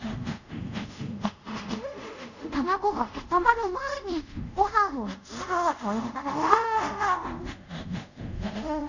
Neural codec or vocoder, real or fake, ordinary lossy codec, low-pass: codec, 24 kHz, 0.5 kbps, DualCodec; fake; none; 7.2 kHz